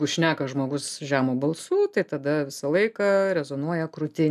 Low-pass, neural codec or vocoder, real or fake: 14.4 kHz; none; real